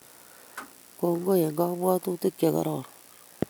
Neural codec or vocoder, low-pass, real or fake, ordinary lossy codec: none; none; real; none